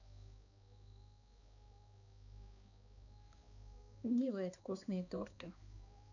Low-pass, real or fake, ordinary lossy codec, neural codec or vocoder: 7.2 kHz; fake; none; codec, 16 kHz, 2 kbps, X-Codec, HuBERT features, trained on balanced general audio